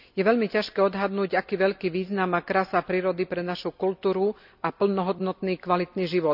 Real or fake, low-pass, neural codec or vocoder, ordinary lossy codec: real; 5.4 kHz; none; none